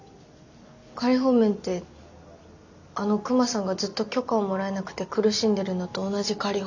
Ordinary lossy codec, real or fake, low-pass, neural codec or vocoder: none; real; 7.2 kHz; none